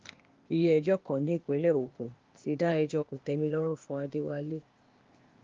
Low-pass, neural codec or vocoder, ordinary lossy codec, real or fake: 7.2 kHz; codec, 16 kHz, 0.8 kbps, ZipCodec; Opus, 32 kbps; fake